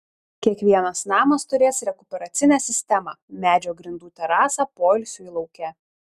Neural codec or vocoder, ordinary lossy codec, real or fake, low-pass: none; Opus, 64 kbps; real; 14.4 kHz